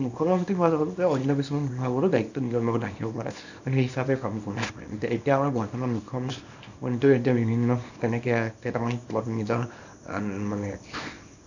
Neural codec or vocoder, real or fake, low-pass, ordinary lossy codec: codec, 24 kHz, 0.9 kbps, WavTokenizer, small release; fake; 7.2 kHz; none